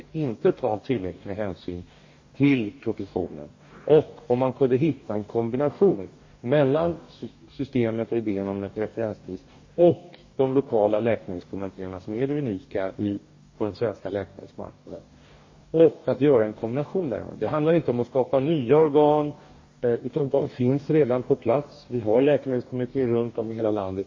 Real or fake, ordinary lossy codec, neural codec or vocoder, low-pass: fake; MP3, 32 kbps; codec, 44.1 kHz, 2.6 kbps, DAC; 7.2 kHz